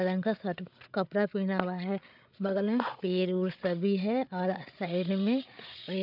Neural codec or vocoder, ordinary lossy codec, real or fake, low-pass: codec, 16 kHz, 8 kbps, FreqCodec, larger model; none; fake; 5.4 kHz